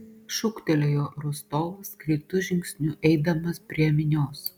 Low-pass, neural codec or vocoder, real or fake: 19.8 kHz; none; real